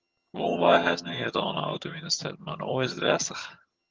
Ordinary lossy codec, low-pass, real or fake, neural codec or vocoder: Opus, 24 kbps; 7.2 kHz; fake; vocoder, 22.05 kHz, 80 mel bands, HiFi-GAN